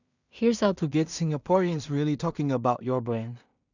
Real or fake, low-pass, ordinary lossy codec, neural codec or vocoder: fake; 7.2 kHz; none; codec, 16 kHz in and 24 kHz out, 0.4 kbps, LongCat-Audio-Codec, two codebook decoder